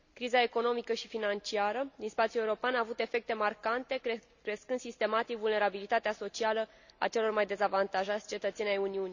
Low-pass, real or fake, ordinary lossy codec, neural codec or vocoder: 7.2 kHz; real; none; none